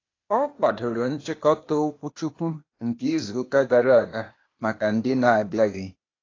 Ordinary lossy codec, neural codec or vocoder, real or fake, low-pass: AAC, 32 kbps; codec, 16 kHz, 0.8 kbps, ZipCodec; fake; 7.2 kHz